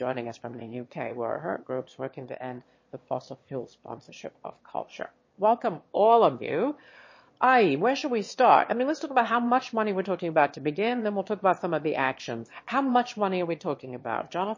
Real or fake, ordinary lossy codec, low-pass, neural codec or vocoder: fake; MP3, 32 kbps; 7.2 kHz; autoencoder, 22.05 kHz, a latent of 192 numbers a frame, VITS, trained on one speaker